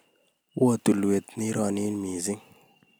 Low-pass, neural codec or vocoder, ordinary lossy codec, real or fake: none; none; none; real